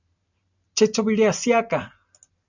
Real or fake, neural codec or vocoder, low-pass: real; none; 7.2 kHz